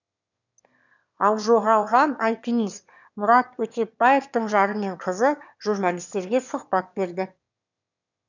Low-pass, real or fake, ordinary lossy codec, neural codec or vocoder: 7.2 kHz; fake; none; autoencoder, 22.05 kHz, a latent of 192 numbers a frame, VITS, trained on one speaker